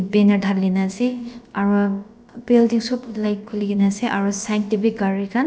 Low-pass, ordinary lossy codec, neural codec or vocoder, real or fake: none; none; codec, 16 kHz, about 1 kbps, DyCAST, with the encoder's durations; fake